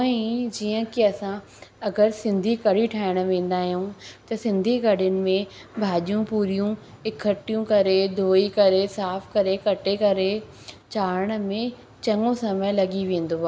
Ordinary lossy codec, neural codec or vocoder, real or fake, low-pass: none; none; real; none